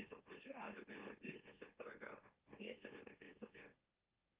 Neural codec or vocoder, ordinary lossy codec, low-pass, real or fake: autoencoder, 44.1 kHz, a latent of 192 numbers a frame, MeloTTS; Opus, 32 kbps; 3.6 kHz; fake